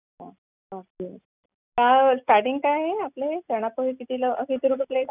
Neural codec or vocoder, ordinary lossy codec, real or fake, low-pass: none; none; real; 3.6 kHz